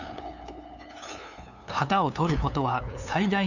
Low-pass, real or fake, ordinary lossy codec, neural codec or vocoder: 7.2 kHz; fake; none; codec, 16 kHz, 2 kbps, FunCodec, trained on LibriTTS, 25 frames a second